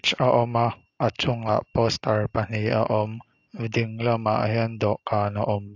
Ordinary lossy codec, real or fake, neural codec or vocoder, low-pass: AAC, 48 kbps; real; none; 7.2 kHz